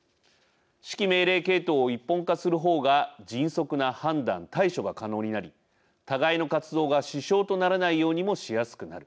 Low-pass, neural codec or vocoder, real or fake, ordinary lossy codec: none; none; real; none